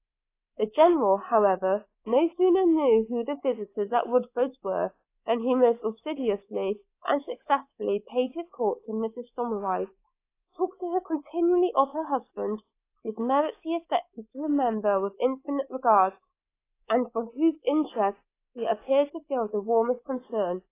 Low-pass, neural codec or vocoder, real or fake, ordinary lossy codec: 3.6 kHz; codec, 24 kHz, 3.1 kbps, DualCodec; fake; AAC, 24 kbps